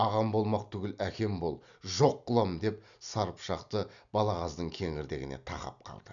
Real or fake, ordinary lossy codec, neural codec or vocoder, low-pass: real; none; none; 7.2 kHz